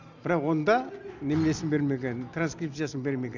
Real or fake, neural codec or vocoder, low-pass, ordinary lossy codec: real; none; 7.2 kHz; none